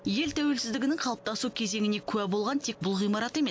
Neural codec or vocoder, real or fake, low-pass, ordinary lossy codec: none; real; none; none